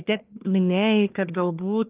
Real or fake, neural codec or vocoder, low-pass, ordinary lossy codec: fake; codec, 44.1 kHz, 1.7 kbps, Pupu-Codec; 3.6 kHz; Opus, 32 kbps